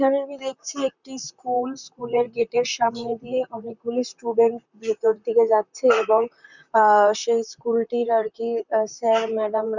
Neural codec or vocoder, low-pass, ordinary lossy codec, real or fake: vocoder, 44.1 kHz, 128 mel bands, Pupu-Vocoder; 7.2 kHz; none; fake